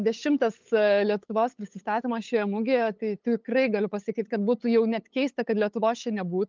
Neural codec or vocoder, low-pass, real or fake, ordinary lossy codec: codec, 16 kHz, 8 kbps, FunCodec, trained on LibriTTS, 25 frames a second; 7.2 kHz; fake; Opus, 24 kbps